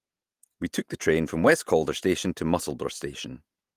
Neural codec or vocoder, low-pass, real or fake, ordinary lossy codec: vocoder, 48 kHz, 128 mel bands, Vocos; 14.4 kHz; fake; Opus, 32 kbps